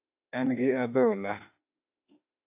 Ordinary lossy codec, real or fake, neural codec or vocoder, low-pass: AAC, 32 kbps; fake; autoencoder, 48 kHz, 32 numbers a frame, DAC-VAE, trained on Japanese speech; 3.6 kHz